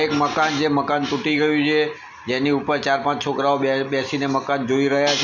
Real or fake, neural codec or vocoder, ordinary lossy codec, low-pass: real; none; none; 7.2 kHz